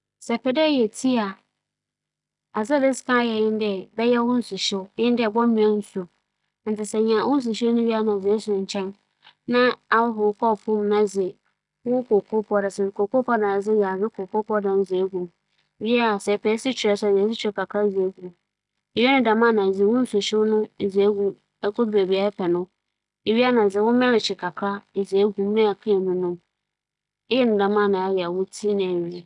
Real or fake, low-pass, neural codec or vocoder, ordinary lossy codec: real; 10.8 kHz; none; none